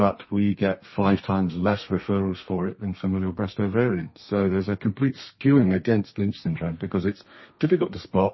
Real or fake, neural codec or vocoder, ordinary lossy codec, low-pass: fake; codec, 32 kHz, 1.9 kbps, SNAC; MP3, 24 kbps; 7.2 kHz